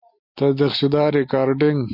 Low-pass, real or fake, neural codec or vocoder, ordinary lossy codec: 5.4 kHz; real; none; MP3, 32 kbps